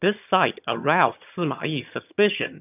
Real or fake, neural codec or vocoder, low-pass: fake; vocoder, 22.05 kHz, 80 mel bands, HiFi-GAN; 3.6 kHz